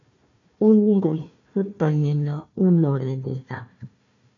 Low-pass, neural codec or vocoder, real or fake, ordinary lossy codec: 7.2 kHz; codec, 16 kHz, 1 kbps, FunCodec, trained on Chinese and English, 50 frames a second; fake; MP3, 96 kbps